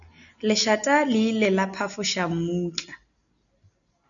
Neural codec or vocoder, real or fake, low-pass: none; real; 7.2 kHz